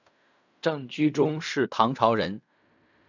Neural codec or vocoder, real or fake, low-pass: codec, 16 kHz in and 24 kHz out, 0.4 kbps, LongCat-Audio-Codec, fine tuned four codebook decoder; fake; 7.2 kHz